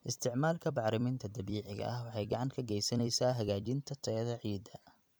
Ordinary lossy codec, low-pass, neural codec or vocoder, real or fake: none; none; vocoder, 44.1 kHz, 128 mel bands every 256 samples, BigVGAN v2; fake